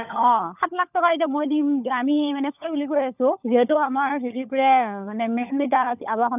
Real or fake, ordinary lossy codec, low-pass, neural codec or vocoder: fake; none; 3.6 kHz; codec, 16 kHz, 16 kbps, FunCodec, trained on LibriTTS, 50 frames a second